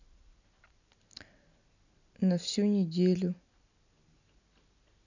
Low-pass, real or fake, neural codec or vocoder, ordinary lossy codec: 7.2 kHz; real; none; none